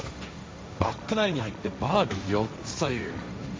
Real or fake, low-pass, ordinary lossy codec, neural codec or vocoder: fake; none; none; codec, 16 kHz, 1.1 kbps, Voila-Tokenizer